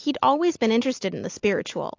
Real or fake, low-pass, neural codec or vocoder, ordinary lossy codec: real; 7.2 kHz; none; AAC, 48 kbps